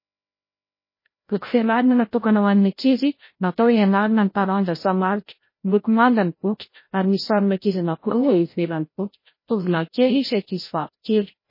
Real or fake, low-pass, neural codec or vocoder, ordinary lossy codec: fake; 5.4 kHz; codec, 16 kHz, 0.5 kbps, FreqCodec, larger model; MP3, 24 kbps